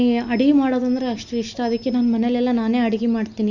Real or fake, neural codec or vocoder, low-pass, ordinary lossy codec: real; none; 7.2 kHz; none